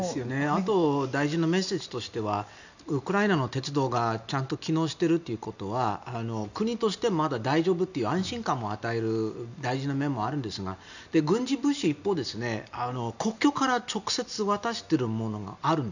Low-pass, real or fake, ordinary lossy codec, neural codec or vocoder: 7.2 kHz; real; none; none